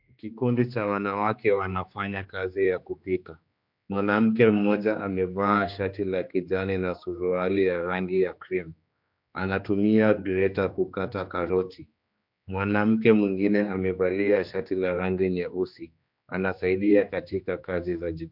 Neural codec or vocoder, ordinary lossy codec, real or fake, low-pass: codec, 16 kHz, 2 kbps, X-Codec, HuBERT features, trained on general audio; MP3, 48 kbps; fake; 5.4 kHz